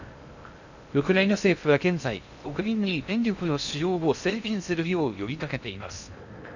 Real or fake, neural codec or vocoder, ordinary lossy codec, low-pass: fake; codec, 16 kHz in and 24 kHz out, 0.6 kbps, FocalCodec, streaming, 2048 codes; none; 7.2 kHz